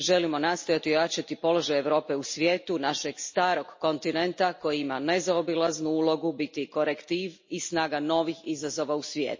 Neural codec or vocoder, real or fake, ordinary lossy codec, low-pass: none; real; MP3, 32 kbps; 7.2 kHz